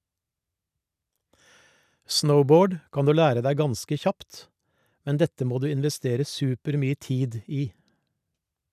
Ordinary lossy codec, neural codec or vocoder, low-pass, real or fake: none; none; 14.4 kHz; real